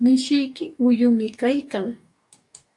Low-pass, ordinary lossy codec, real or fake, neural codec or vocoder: 10.8 kHz; AAC, 64 kbps; fake; codec, 44.1 kHz, 2.6 kbps, DAC